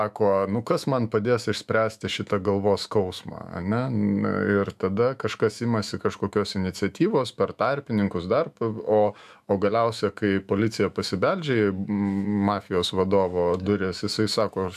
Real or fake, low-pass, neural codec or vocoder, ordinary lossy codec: fake; 14.4 kHz; autoencoder, 48 kHz, 128 numbers a frame, DAC-VAE, trained on Japanese speech; AAC, 96 kbps